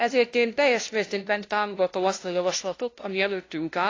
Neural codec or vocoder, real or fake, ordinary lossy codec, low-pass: codec, 16 kHz, 0.5 kbps, FunCodec, trained on LibriTTS, 25 frames a second; fake; AAC, 32 kbps; 7.2 kHz